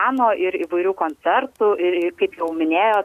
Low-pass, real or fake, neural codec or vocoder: 14.4 kHz; real; none